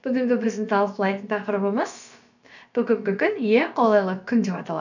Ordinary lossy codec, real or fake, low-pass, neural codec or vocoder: none; fake; 7.2 kHz; codec, 16 kHz, about 1 kbps, DyCAST, with the encoder's durations